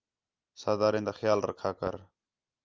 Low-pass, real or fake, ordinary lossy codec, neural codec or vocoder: 7.2 kHz; real; Opus, 32 kbps; none